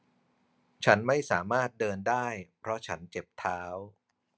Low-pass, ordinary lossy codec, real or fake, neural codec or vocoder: none; none; real; none